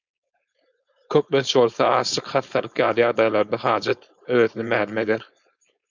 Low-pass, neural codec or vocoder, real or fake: 7.2 kHz; codec, 16 kHz, 4.8 kbps, FACodec; fake